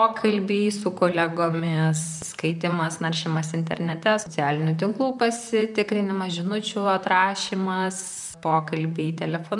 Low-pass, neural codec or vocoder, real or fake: 10.8 kHz; vocoder, 44.1 kHz, 128 mel bands, Pupu-Vocoder; fake